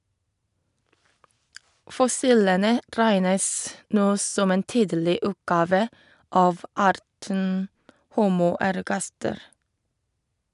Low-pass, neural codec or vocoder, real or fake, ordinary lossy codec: 10.8 kHz; none; real; none